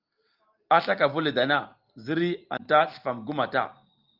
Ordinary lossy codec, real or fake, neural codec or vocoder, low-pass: Opus, 24 kbps; real; none; 5.4 kHz